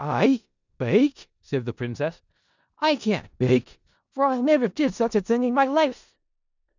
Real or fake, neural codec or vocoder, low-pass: fake; codec, 16 kHz in and 24 kHz out, 0.4 kbps, LongCat-Audio-Codec, four codebook decoder; 7.2 kHz